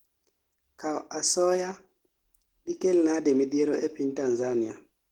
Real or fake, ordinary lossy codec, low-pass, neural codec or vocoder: real; Opus, 16 kbps; 19.8 kHz; none